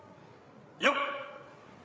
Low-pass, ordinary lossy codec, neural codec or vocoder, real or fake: none; none; codec, 16 kHz, 8 kbps, FreqCodec, larger model; fake